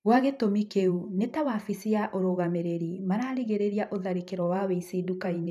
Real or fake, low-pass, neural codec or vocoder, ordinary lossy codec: fake; 14.4 kHz; vocoder, 44.1 kHz, 128 mel bands every 512 samples, BigVGAN v2; none